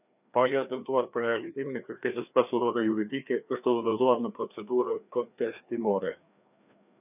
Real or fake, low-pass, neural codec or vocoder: fake; 3.6 kHz; codec, 16 kHz, 2 kbps, FreqCodec, larger model